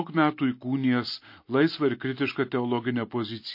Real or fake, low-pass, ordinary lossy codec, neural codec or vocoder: real; 5.4 kHz; MP3, 32 kbps; none